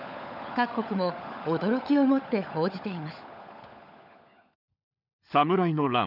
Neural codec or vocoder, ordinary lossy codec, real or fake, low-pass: codec, 16 kHz, 16 kbps, FunCodec, trained on LibriTTS, 50 frames a second; none; fake; 5.4 kHz